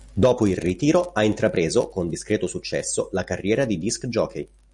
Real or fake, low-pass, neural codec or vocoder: real; 10.8 kHz; none